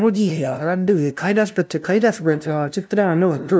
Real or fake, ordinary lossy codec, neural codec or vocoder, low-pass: fake; none; codec, 16 kHz, 0.5 kbps, FunCodec, trained on LibriTTS, 25 frames a second; none